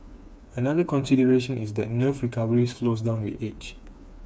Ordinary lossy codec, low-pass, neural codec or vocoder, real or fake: none; none; codec, 16 kHz, 4 kbps, FreqCodec, smaller model; fake